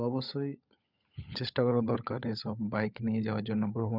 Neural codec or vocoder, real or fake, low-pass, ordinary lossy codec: codec, 16 kHz, 16 kbps, FunCodec, trained on LibriTTS, 50 frames a second; fake; 5.4 kHz; none